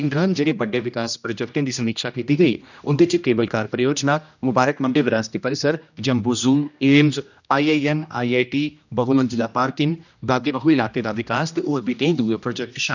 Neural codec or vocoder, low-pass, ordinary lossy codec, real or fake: codec, 16 kHz, 1 kbps, X-Codec, HuBERT features, trained on general audio; 7.2 kHz; none; fake